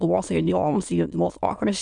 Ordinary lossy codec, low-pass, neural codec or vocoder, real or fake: MP3, 96 kbps; 9.9 kHz; autoencoder, 22.05 kHz, a latent of 192 numbers a frame, VITS, trained on many speakers; fake